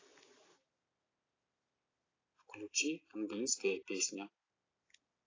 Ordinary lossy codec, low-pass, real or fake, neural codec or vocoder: AAC, 32 kbps; 7.2 kHz; real; none